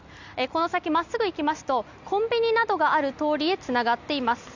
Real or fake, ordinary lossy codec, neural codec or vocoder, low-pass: real; none; none; 7.2 kHz